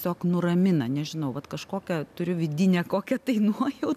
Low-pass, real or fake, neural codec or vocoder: 14.4 kHz; real; none